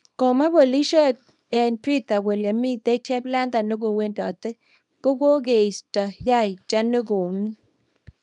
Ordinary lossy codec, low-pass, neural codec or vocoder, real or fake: none; 10.8 kHz; codec, 24 kHz, 0.9 kbps, WavTokenizer, small release; fake